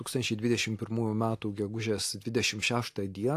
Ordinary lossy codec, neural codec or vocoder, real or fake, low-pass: AAC, 64 kbps; none; real; 14.4 kHz